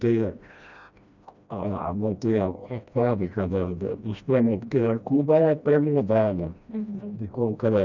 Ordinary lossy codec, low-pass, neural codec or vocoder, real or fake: none; 7.2 kHz; codec, 16 kHz, 1 kbps, FreqCodec, smaller model; fake